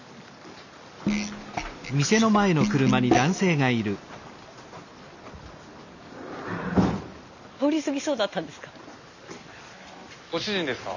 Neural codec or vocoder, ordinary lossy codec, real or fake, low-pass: none; none; real; 7.2 kHz